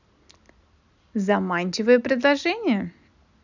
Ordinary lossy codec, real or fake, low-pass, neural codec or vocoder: none; real; 7.2 kHz; none